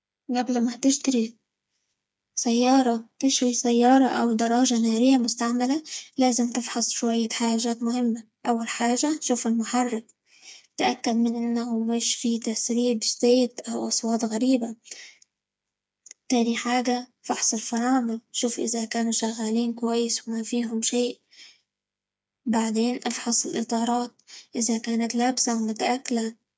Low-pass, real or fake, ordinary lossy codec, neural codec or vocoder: none; fake; none; codec, 16 kHz, 4 kbps, FreqCodec, smaller model